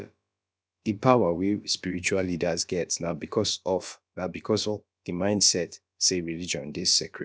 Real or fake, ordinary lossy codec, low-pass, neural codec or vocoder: fake; none; none; codec, 16 kHz, about 1 kbps, DyCAST, with the encoder's durations